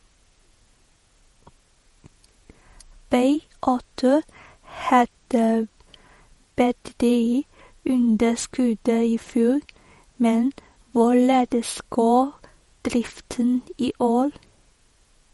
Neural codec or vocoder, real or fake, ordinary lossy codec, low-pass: vocoder, 48 kHz, 128 mel bands, Vocos; fake; MP3, 48 kbps; 19.8 kHz